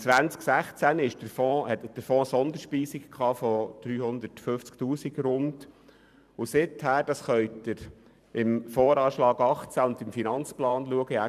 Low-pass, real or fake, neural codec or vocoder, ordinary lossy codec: 14.4 kHz; fake; vocoder, 44.1 kHz, 128 mel bands every 512 samples, BigVGAN v2; none